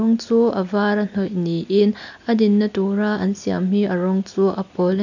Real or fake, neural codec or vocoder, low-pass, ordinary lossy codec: real; none; 7.2 kHz; none